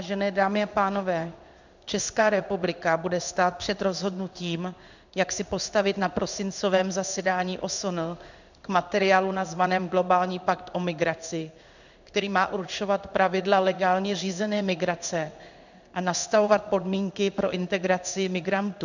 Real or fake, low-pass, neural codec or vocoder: fake; 7.2 kHz; codec, 16 kHz in and 24 kHz out, 1 kbps, XY-Tokenizer